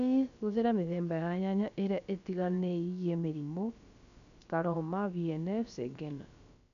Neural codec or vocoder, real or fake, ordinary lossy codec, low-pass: codec, 16 kHz, about 1 kbps, DyCAST, with the encoder's durations; fake; none; 7.2 kHz